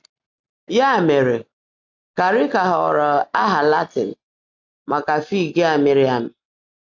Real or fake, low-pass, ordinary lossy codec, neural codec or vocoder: real; 7.2 kHz; AAC, 48 kbps; none